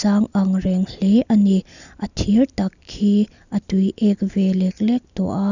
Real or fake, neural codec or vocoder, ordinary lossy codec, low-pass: real; none; none; 7.2 kHz